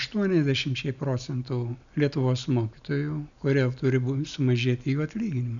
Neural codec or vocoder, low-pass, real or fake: none; 7.2 kHz; real